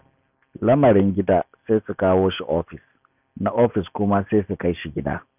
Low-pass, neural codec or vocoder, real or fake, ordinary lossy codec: 3.6 kHz; none; real; none